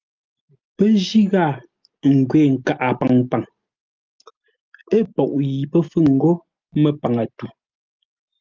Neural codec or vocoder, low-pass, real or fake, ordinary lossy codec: none; 7.2 kHz; real; Opus, 24 kbps